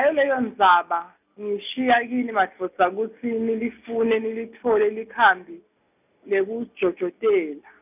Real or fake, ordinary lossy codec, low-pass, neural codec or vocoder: real; none; 3.6 kHz; none